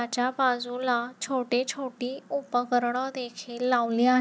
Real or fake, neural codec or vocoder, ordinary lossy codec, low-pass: real; none; none; none